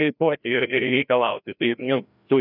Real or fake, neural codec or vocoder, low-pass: fake; codec, 16 kHz, 1 kbps, FreqCodec, larger model; 5.4 kHz